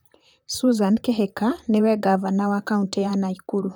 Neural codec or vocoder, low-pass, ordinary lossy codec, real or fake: vocoder, 44.1 kHz, 128 mel bands, Pupu-Vocoder; none; none; fake